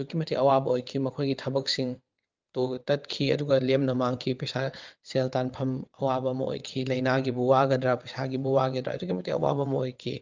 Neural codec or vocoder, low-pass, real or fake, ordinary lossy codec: vocoder, 22.05 kHz, 80 mel bands, WaveNeXt; 7.2 kHz; fake; Opus, 24 kbps